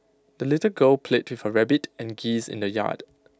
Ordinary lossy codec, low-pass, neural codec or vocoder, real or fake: none; none; none; real